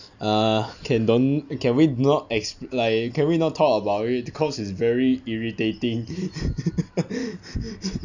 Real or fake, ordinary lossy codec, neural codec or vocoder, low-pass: real; none; none; 7.2 kHz